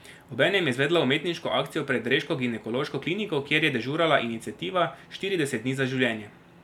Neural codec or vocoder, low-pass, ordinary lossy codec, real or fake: none; 19.8 kHz; none; real